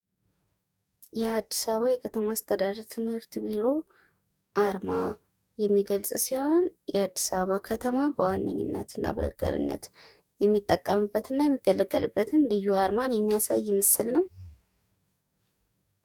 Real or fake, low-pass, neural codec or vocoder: fake; 19.8 kHz; codec, 44.1 kHz, 2.6 kbps, DAC